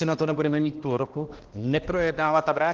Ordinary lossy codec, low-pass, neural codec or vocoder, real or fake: Opus, 16 kbps; 7.2 kHz; codec, 16 kHz, 1 kbps, X-Codec, HuBERT features, trained on balanced general audio; fake